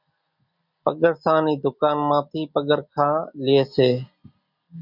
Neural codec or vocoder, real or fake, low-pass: none; real; 5.4 kHz